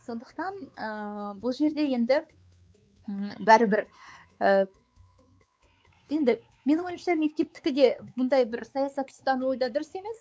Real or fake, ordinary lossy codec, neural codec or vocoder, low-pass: fake; none; codec, 16 kHz, 4 kbps, X-Codec, WavLM features, trained on Multilingual LibriSpeech; none